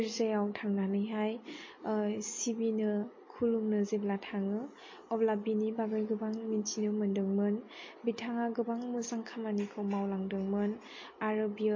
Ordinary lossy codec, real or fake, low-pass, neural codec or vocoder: MP3, 32 kbps; real; 7.2 kHz; none